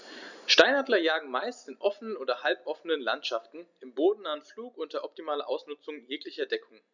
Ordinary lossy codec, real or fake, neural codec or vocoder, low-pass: none; real; none; 7.2 kHz